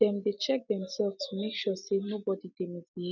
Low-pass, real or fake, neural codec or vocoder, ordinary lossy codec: 7.2 kHz; real; none; none